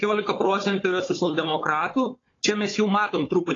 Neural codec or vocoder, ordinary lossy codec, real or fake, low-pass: codec, 16 kHz, 4 kbps, FunCodec, trained on Chinese and English, 50 frames a second; AAC, 32 kbps; fake; 7.2 kHz